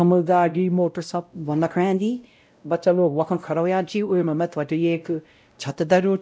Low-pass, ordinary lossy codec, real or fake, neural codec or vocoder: none; none; fake; codec, 16 kHz, 0.5 kbps, X-Codec, WavLM features, trained on Multilingual LibriSpeech